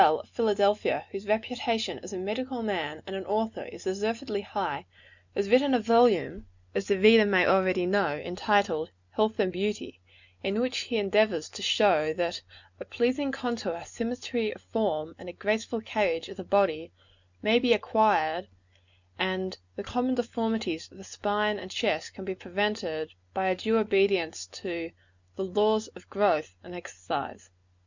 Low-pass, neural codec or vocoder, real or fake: 7.2 kHz; none; real